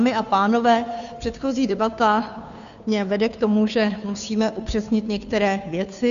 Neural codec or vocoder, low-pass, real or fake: codec, 16 kHz, 2 kbps, FunCodec, trained on Chinese and English, 25 frames a second; 7.2 kHz; fake